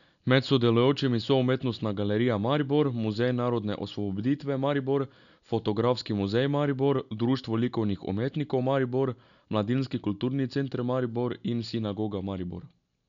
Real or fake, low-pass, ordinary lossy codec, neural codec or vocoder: real; 7.2 kHz; none; none